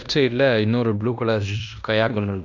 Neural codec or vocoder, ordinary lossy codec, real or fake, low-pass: codec, 16 kHz in and 24 kHz out, 0.9 kbps, LongCat-Audio-Codec, fine tuned four codebook decoder; none; fake; 7.2 kHz